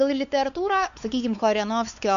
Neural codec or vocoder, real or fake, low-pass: codec, 16 kHz, 4 kbps, X-Codec, WavLM features, trained on Multilingual LibriSpeech; fake; 7.2 kHz